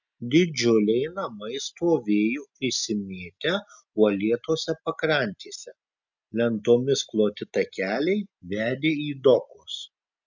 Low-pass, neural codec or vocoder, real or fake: 7.2 kHz; none; real